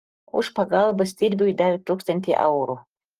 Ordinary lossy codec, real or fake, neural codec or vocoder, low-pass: Opus, 24 kbps; fake; codec, 44.1 kHz, 7.8 kbps, Pupu-Codec; 14.4 kHz